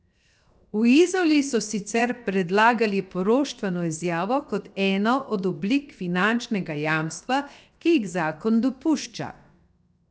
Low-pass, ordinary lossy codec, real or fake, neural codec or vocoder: none; none; fake; codec, 16 kHz, 0.7 kbps, FocalCodec